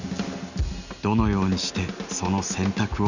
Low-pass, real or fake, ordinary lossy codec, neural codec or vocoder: 7.2 kHz; real; none; none